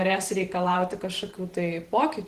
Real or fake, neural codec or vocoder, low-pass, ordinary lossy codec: fake; vocoder, 44.1 kHz, 128 mel bands every 512 samples, BigVGAN v2; 14.4 kHz; Opus, 16 kbps